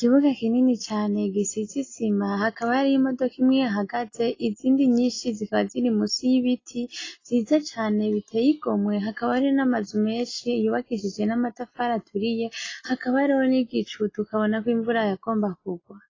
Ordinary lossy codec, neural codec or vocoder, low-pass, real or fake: AAC, 32 kbps; none; 7.2 kHz; real